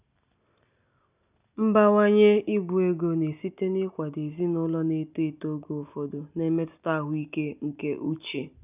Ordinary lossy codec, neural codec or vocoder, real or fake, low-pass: none; none; real; 3.6 kHz